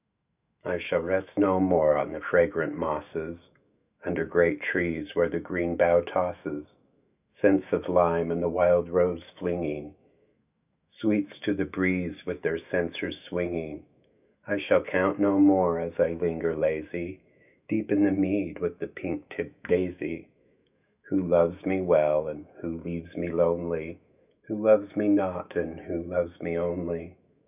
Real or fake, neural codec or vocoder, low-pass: fake; autoencoder, 48 kHz, 128 numbers a frame, DAC-VAE, trained on Japanese speech; 3.6 kHz